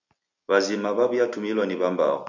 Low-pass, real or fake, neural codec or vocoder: 7.2 kHz; real; none